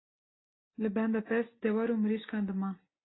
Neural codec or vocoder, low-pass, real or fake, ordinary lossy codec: none; 7.2 kHz; real; AAC, 16 kbps